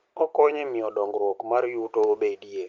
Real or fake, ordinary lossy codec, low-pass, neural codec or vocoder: real; Opus, 24 kbps; 7.2 kHz; none